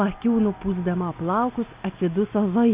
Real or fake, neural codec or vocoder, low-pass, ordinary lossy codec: real; none; 3.6 kHz; Opus, 64 kbps